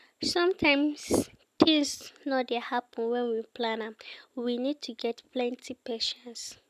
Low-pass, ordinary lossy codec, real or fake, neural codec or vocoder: 14.4 kHz; none; real; none